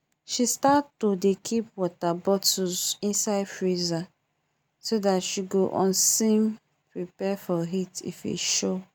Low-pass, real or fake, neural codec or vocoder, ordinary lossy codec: none; real; none; none